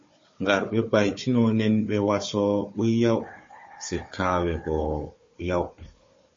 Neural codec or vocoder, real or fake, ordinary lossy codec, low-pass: codec, 16 kHz, 4 kbps, FunCodec, trained on Chinese and English, 50 frames a second; fake; MP3, 32 kbps; 7.2 kHz